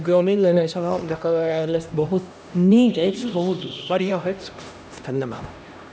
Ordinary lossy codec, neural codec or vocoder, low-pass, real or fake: none; codec, 16 kHz, 1 kbps, X-Codec, HuBERT features, trained on LibriSpeech; none; fake